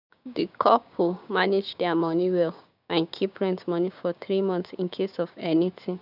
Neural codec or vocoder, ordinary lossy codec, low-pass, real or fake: codec, 16 kHz, 6 kbps, DAC; none; 5.4 kHz; fake